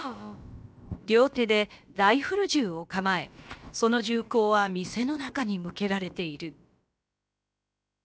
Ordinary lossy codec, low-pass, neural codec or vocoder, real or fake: none; none; codec, 16 kHz, about 1 kbps, DyCAST, with the encoder's durations; fake